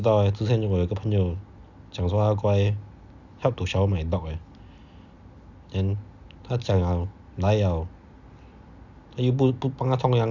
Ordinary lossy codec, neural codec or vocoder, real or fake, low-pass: none; none; real; 7.2 kHz